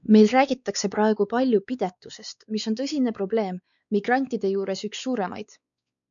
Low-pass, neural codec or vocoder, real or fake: 7.2 kHz; codec, 16 kHz, 4 kbps, X-Codec, WavLM features, trained on Multilingual LibriSpeech; fake